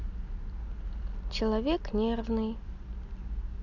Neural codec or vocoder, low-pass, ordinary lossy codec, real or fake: none; 7.2 kHz; none; real